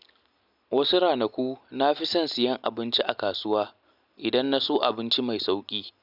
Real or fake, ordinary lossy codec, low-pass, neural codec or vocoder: real; none; 5.4 kHz; none